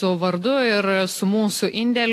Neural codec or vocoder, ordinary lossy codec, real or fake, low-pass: none; AAC, 64 kbps; real; 14.4 kHz